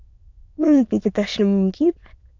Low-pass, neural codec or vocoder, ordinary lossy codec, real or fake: 7.2 kHz; autoencoder, 22.05 kHz, a latent of 192 numbers a frame, VITS, trained on many speakers; MP3, 64 kbps; fake